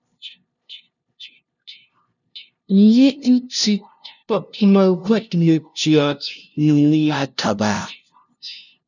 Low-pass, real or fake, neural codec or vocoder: 7.2 kHz; fake; codec, 16 kHz, 0.5 kbps, FunCodec, trained on LibriTTS, 25 frames a second